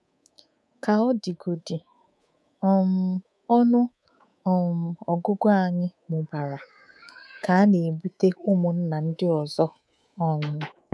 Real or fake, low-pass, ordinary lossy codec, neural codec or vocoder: fake; none; none; codec, 24 kHz, 3.1 kbps, DualCodec